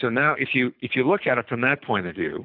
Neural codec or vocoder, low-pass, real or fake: codec, 24 kHz, 6 kbps, HILCodec; 5.4 kHz; fake